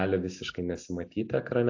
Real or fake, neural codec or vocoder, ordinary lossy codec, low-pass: real; none; AAC, 48 kbps; 7.2 kHz